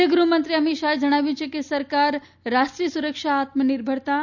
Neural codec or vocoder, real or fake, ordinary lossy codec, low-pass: none; real; none; 7.2 kHz